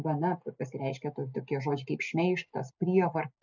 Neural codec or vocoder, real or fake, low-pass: none; real; 7.2 kHz